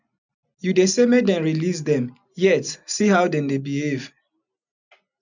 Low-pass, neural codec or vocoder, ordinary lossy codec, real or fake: 7.2 kHz; none; none; real